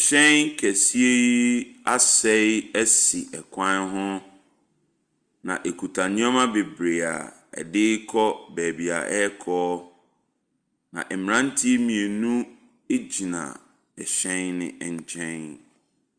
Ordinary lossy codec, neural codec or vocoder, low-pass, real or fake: Opus, 32 kbps; none; 9.9 kHz; real